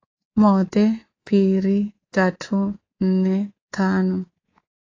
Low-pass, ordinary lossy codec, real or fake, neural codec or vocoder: 7.2 kHz; AAC, 32 kbps; fake; codec, 24 kHz, 3.1 kbps, DualCodec